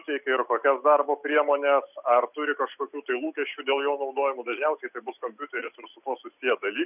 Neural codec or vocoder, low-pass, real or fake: none; 3.6 kHz; real